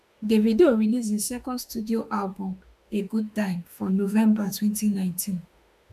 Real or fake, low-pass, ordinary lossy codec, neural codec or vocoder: fake; 14.4 kHz; AAC, 96 kbps; autoencoder, 48 kHz, 32 numbers a frame, DAC-VAE, trained on Japanese speech